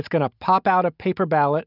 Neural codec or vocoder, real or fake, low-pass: none; real; 5.4 kHz